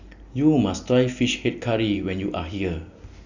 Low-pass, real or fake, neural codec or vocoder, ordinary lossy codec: 7.2 kHz; real; none; none